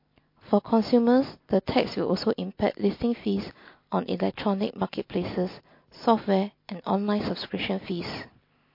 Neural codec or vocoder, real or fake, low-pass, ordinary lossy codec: none; real; 5.4 kHz; MP3, 32 kbps